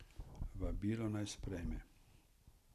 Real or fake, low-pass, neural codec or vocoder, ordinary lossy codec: real; 14.4 kHz; none; none